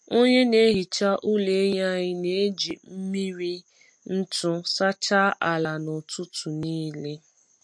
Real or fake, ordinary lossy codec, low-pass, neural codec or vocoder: real; MP3, 48 kbps; 9.9 kHz; none